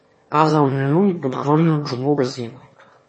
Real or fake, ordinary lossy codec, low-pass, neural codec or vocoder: fake; MP3, 32 kbps; 9.9 kHz; autoencoder, 22.05 kHz, a latent of 192 numbers a frame, VITS, trained on one speaker